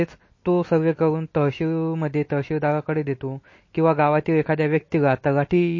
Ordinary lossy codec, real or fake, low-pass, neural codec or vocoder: MP3, 32 kbps; real; 7.2 kHz; none